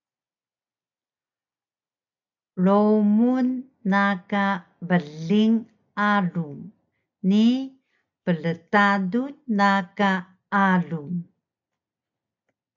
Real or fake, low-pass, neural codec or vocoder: real; 7.2 kHz; none